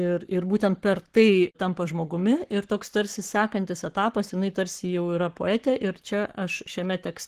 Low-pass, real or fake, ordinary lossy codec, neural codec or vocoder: 14.4 kHz; fake; Opus, 16 kbps; codec, 44.1 kHz, 7.8 kbps, Pupu-Codec